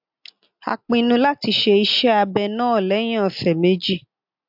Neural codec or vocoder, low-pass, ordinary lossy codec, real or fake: none; 5.4 kHz; MP3, 48 kbps; real